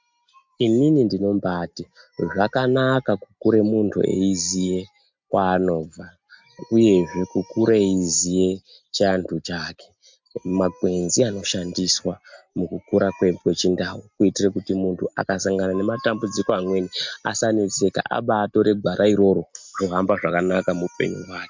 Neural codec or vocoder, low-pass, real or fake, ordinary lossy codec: none; 7.2 kHz; real; MP3, 64 kbps